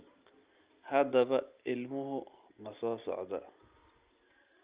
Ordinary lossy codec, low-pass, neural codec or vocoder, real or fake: Opus, 32 kbps; 3.6 kHz; none; real